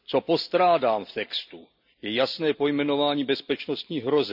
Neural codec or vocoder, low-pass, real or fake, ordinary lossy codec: none; 5.4 kHz; real; none